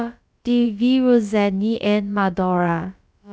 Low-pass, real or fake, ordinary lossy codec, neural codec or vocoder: none; fake; none; codec, 16 kHz, about 1 kbps, DyCAST, with the encoder's durations